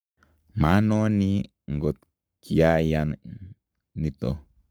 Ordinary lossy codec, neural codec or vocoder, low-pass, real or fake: none; codec, 44.1 kHz, 7.8 kbps, Pupu-Codec; none; fake